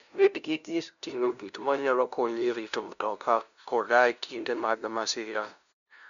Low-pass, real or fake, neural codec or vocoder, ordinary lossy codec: 7.2 kHz; fake; codec, 16 kHz, 0.5 kbps, FunCodec, trained on LibriTTS, 25 frames a second; MP3, 96 kbps